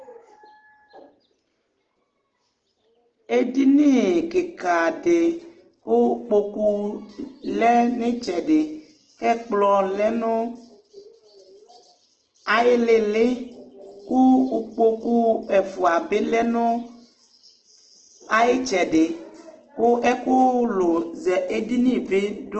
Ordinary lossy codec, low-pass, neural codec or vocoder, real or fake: Opus, 16 kbps; 7.2 kHz; none; real